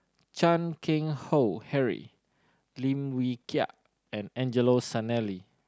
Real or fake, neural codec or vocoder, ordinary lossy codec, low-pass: real; none; none; none